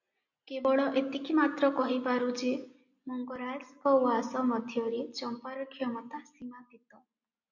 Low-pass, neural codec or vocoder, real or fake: 7.2 kHz; none; real